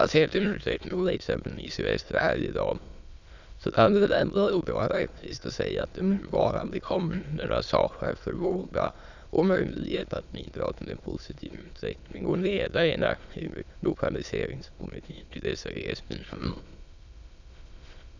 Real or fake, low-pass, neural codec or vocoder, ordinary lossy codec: fake; 7.2 kHz; autoencoder, 22.05 kHz, a latent of 192 numbers a frame, VITS, trained on many speakers; none